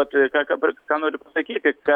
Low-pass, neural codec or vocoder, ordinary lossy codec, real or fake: 19.8 kHz; autoencoder, 48 kHz, 128 numbers a frame, DAC-VAE, trained on Japanese speech; MP3, 96 kbps; fake